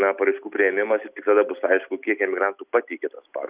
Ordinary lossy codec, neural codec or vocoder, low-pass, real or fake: Opus, 64 kbps; none; 3.6 kHz; real